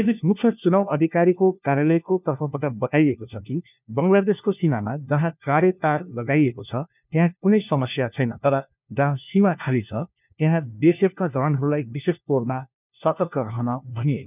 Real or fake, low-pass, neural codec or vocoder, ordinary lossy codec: fake; 3.6 kHz; codec, 16 kHz, 1 kbps, FunCodec, trained on LibriTTS, 50 frames a second; none